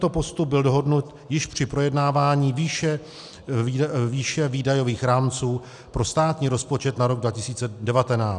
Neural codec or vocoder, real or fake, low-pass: none; real; 10.8 kHz